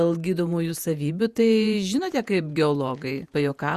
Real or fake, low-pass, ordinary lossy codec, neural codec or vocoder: fake; 14.4 kHz; Opus, 64 kbps; vocoder, 48 kHz, 128 mel bands, Vocos